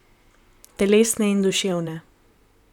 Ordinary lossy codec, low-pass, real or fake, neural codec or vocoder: none; 19.8 kHz; fake; vocoder, 44.1 kHz, 128 mel bands, Pupu-Vocoder